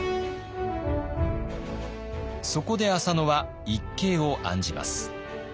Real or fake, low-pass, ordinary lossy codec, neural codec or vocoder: real; none; none; none